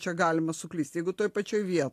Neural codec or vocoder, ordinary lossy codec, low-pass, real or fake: none; AAC, 64 kbps; 14.4 kHz; real